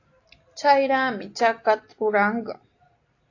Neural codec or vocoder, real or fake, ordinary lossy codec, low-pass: none; real; AAC, 48 kbps; 7.2 kHz